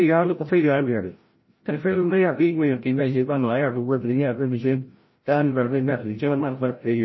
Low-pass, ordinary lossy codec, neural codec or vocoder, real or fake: 7.2 kHz; MP3, 24 kbps; codec, 16 kHz, 0.5 kbps, FreqCodec, larger model; fake